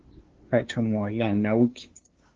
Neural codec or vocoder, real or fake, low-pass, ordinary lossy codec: codec, 16 kHz, 2 kbps, FunCodec, trained on LibriTTS, 25 frames a second; fake; 7.2 kHz; Opus, 16 kbps